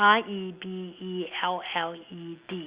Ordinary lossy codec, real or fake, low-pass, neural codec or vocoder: Opus, 64 kbps; real; 3.6 kHz; none